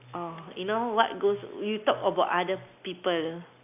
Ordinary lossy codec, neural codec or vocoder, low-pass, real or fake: none; none; 3.6 kHz; real